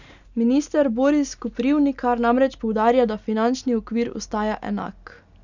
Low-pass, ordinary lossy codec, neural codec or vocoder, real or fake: 7.2 kHz; none; none; real